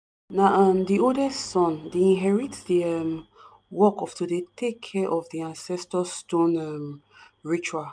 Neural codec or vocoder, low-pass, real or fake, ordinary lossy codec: none; none; real; none